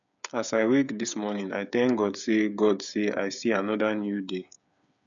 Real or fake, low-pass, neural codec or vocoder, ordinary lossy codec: fake; 7.2 kHz; codec, 16 kHz, 8 kbps, FreqCodec, smaller model; none